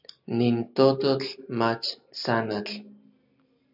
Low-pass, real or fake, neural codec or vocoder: 5.4 kHz; real; none